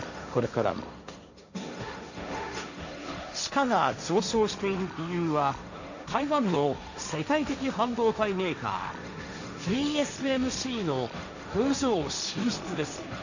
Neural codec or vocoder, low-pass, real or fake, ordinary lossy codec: codec, 16 kHz, 1.1 kbps, Voila-Tokenizer; 7.2 kHz; fake; none